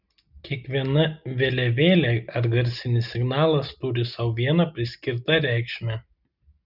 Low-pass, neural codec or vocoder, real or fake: 5.4 kHz; none; real